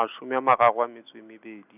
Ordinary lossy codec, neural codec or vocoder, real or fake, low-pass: none; none; real; 3.6 kHz